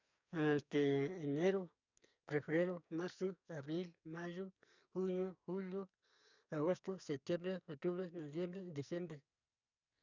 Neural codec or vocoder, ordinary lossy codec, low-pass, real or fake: codec, 44.1 kHz, 2.6 kbps, SNAC; none; 7.2 kHz; fake